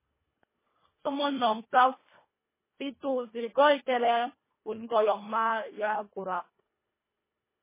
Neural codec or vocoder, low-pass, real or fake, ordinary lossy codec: codec, 24 kHz, 1.5 kbps, HILCodec; 3.6 kHz; fake; MP3, 16 kbps